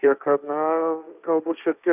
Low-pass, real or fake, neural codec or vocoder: 3.6 kHz; fake; codec, 16 kHz, 1.1 kbps, Voila-Tokenizer